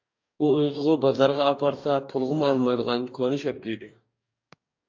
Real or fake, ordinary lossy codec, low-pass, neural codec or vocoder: fake; AAC, 48 kbps; 7.2 kHz; codec, 44.1 kHz, 2.6 kbps, DAC